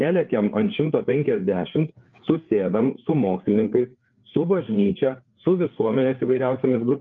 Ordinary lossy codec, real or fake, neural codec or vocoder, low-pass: Opus, 32 kbps; fake; codec, 16 kHz, 4 kbps, FreqCodec, larger model; 7.2 kHz